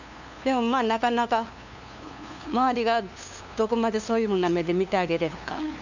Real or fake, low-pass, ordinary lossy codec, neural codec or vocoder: fake; 7.2 kHz; none; codec, 16 kHz, 2 kbps, FunCodec, trained on LibriTTS, 25 frames a second